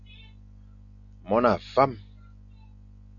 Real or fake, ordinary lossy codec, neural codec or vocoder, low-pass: real; MP3, 96 kbps; none; 7.2 kHz